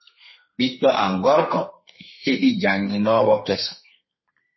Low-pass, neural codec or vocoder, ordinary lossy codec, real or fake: 7.2 kHz; codec, 32 kHz, 1.9 kbps, SNAC; MP3, 24 kbps; fake